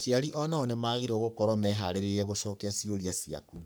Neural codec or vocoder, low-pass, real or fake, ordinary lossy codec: codec, 44.1 kHz, 3.4 kbps, Pupu-Codec; none; fake; none